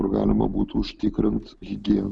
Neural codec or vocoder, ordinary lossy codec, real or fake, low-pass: none; Opus, 64 kbps; real; 9.9 kHz